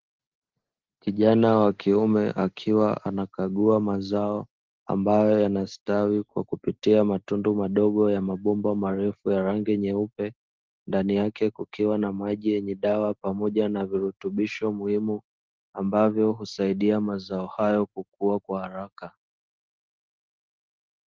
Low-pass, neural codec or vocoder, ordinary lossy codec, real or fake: 7.2 kHz; none; Opus, 16 kbps; real